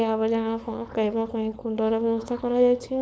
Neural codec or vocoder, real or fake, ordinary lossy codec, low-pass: codec, 16 kHz, 4.8 kbps, FACodec; fake; none; none